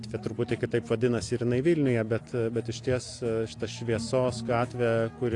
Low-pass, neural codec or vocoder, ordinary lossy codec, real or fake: 10.8 kHz; none; AAC, 48 kbps; real